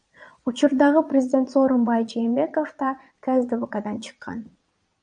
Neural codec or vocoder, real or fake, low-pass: vocoder, 22.05 kHz, 80 mel bands, Vocos; fake; 9.9 kHz